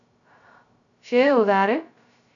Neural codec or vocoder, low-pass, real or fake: codec, 16 kHz, 0.2 kbps, FocalCodec; 7.2 kHz; fake